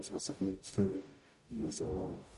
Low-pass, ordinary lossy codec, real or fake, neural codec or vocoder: 14.4 kHz; MP3, 48 kbps; fake; codec, 44.1 kHz, 0.9 kbps, DAC